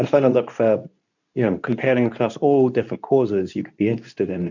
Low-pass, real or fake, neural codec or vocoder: 7.2 kHz; fake; codec, 24 kHz, 0.9 kbps, WavTokenizer, medium speech release version 2